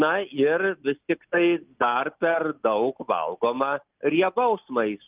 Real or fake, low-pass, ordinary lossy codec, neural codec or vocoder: real; 3.6 kHz; Opus, 32 kbps; none